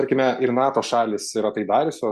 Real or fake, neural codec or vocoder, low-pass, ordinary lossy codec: real; none; 14.4 kHz; Opus, 64 kbps